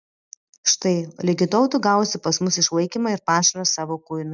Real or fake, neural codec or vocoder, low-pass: real; none; 7.2 kHz